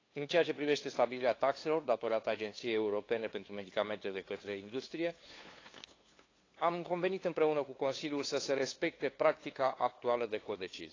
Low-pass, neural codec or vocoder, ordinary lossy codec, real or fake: 7.2 kHz; codec, 16 kHz, 2 kbps, FunCodec, trained on LibriTTS, 25 frames a second; AAC, 32 kbps; fake